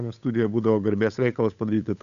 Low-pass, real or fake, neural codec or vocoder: 7.2 kHz; fake; codec, 16 kHz, 8 kbps, FunCodec, trained on Chinese and English, 25 frames a second